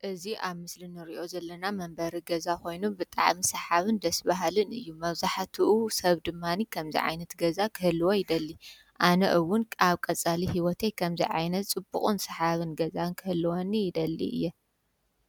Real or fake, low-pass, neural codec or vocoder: real; 19.8 kHz; none